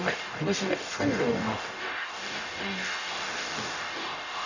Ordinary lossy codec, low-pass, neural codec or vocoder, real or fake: none; 7.2 kHz; codec, 44.1 kHz, 0.9 kbps, DAC; fake